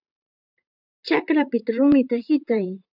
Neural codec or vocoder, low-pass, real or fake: vocoder, 44.1 kHz, 128 mel bands, Pupu-Vocoder; 5.4 kHz; fake